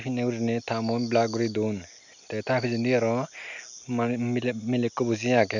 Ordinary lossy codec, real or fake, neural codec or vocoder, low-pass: none; real; none; 7.2 kHz